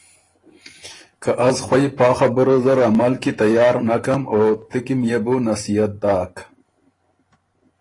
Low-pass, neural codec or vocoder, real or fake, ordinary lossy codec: 10.8 kHz; vocoder, 44.1 kHz, 128 mel bands every 512 samples, BigVGAN v2; fake; AAC, 32 kbps